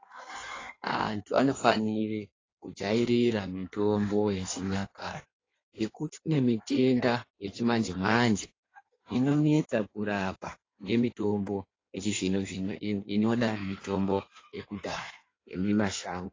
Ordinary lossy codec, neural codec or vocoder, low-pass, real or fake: AAC, 32 kbps; codec, 16 kHz in and 24 kHz out, 1.1 kbps, FireRedTTS-2 codec; 7.2 kHz; fake